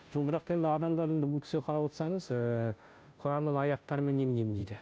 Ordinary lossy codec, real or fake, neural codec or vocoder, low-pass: none; fake; codec, 16 kHz, 0.5 kbps, FunCodec, trained on Chinese and English, 25 frames a second; none